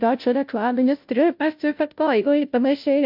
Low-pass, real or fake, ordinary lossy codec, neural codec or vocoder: 5.4 kHz; fake; MP3, 48 kbps; codec, 16 kHz, 0.5 kbps, FunCodec, trained on Chinese and English, 25 frames a second